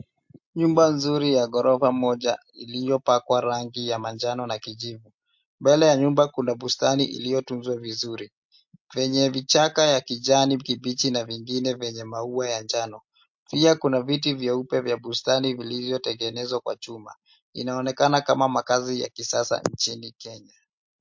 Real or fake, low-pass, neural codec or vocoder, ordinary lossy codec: real; 7.2 kHz; none; MP3, 48 kbps